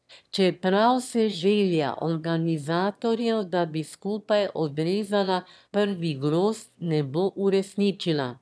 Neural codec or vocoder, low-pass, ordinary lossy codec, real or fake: autoencoder, 22.05 kHz, a latent of 192 numbers a frame, VITS, trained on one speaker; none; none; fake